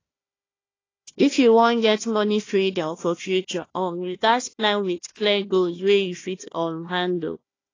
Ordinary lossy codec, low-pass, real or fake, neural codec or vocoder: AAC, 32 kbps; 7.2 kHz; fake; codec, 16 kHz, 1 kbps, FunCodec, trained on Chinese and English, 50 frames a second